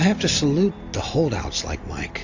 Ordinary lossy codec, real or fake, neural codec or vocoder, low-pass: AAC, 48 kbps; real; none; 7.2 kHz